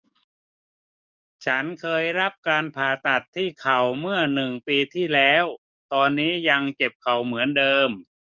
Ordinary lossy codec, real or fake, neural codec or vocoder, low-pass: none; fake; codec, 44.1 kHz, 7.8 kbps, DAC; 7.2 kHz